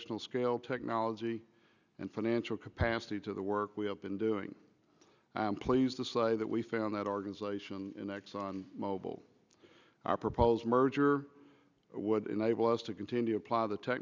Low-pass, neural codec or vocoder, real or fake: 7.2 kHz; none; real